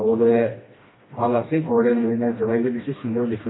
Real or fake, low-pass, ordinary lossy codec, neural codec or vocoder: fake; 7.2 kHz; AAC, 16 kbps; codec, 16 kHz, 1 kbps, FreqCodec, smaller model